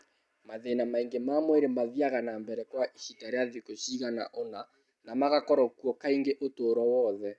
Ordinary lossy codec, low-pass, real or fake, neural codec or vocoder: none; none; real; none